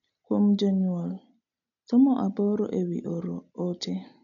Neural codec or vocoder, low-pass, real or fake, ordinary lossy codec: none; 7.2 kHz; real; none